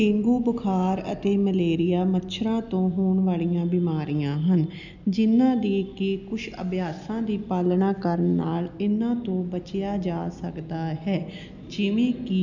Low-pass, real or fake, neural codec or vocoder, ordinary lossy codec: 7.2 kHz; real; none; none